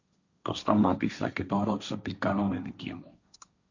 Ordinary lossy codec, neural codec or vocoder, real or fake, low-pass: Opus, 64 kbps; codec, 16 kHz, 1.1 kbps, Voila-Tokenizer; fake; 7.2 kHz